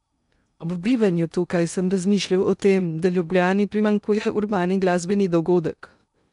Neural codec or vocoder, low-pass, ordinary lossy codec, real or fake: codec, 16 kHz in and 24 kHz out, 0.6 kbps, FocalCodec, streaming, 2048 codes; 10.8 kHz; none; fake